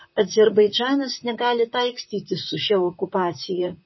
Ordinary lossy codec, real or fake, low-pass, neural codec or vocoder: MP3, 24 kbps; fake; 7.2 kHz; vocoder, 44.1 kHz, 128 mel bands, Pupu-Vocoder